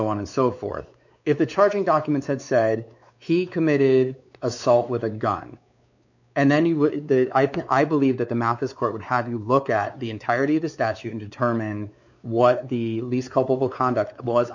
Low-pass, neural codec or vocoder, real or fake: 7.2 kHz; codec, 16 kHz, 4 kbps, X-Codec, WavLM features, trained on Multilingual LibriSpeech; fake